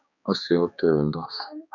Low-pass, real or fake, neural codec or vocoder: 7.2 kHz; fake; codec, 16 kHz, 2 kbps, X-Codec, HuBERT features, trained on balanced general audio